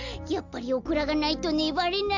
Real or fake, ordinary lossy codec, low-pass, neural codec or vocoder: real; none; 7.2 kHz; none